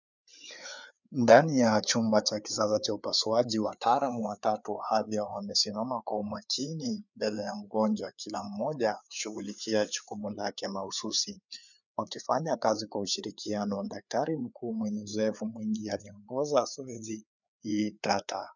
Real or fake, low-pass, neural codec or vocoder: fake; 7.2 kHz; codec, 16 kHz, 4 kbps, FreqCodec, larger model